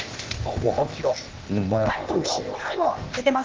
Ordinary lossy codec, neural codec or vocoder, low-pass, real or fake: Opus, 16 kbps; codec, 16 kHz, 0.8 kbps, ZipCodec; 7.2 kHz; fake